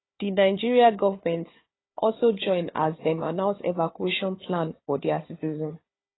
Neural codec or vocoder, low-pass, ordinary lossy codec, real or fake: codec, 16 kHz, 4 kbps, FunCodec, trained on Chinese and English, 50 frames a second; 7.2 kHz; AAC, 16 kbps; fake